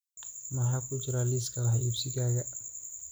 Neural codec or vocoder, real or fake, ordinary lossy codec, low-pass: none; real; none; none